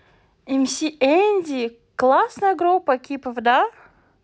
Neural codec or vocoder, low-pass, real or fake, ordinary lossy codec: none; none; real; none